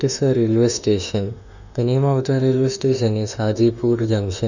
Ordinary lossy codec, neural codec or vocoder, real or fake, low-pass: none; autoencoder, 48 kHz, 32 numbers a frame, DAC-VAE, trained on Japanese speech; fake; 7.2 kHz